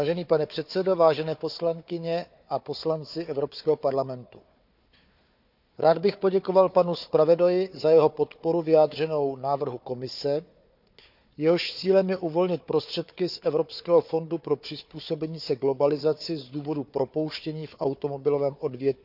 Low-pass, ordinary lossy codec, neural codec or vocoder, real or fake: 5.4 kHz; none; codec, 16 kHz, 4 kbps, FunCodec, trained on Chinese and English, 50 frames a second; fake